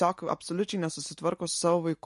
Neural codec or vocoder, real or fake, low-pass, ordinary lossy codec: none; real; 14.4 kHz; MP3, 48 kbps